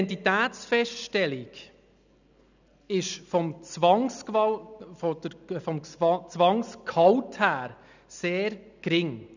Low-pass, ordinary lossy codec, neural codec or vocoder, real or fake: 7.2 kHz; none; none; real